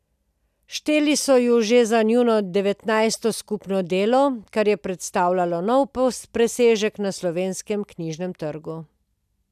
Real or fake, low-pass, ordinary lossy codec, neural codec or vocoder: real; 14.4 kHz; none; none